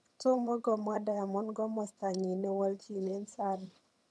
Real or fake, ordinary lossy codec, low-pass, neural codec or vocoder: fake; none; none; vocoder, 22.05 kHz, 80 mel bands, HiFi-GAN